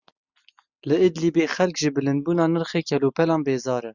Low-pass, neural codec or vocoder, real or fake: 7.2 kHz; none; real